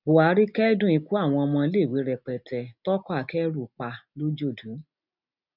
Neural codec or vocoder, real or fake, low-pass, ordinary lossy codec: none; real; 5.4 kHz; none